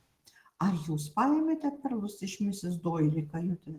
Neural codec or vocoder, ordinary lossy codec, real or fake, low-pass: none; Opus, 16 kbps; real; 14.4 kHz